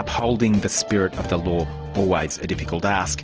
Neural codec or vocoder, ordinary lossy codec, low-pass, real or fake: none; Opus, 16 kbps; 7.2 kHz; real